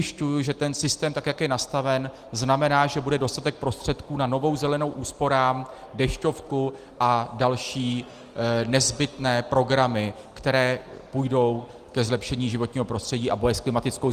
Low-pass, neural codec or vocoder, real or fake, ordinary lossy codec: 14.4 kHz; none; real; Opus, 24 kbps